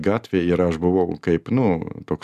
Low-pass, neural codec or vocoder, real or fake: 14.4 kHz; none; real